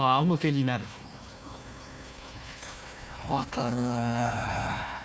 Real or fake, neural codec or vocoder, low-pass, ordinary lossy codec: fake; codec, 16 kHz, 1 kbps, FunCodec, trained on Chinese and English, 50 frames a second; none; none